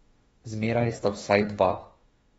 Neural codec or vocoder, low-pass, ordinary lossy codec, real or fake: autoencoder, 48 kHz, 32 numbers a frame, DAC-VAE, trained on Japanese speech; 19.8 kHz; AAC, 24 kbps; fake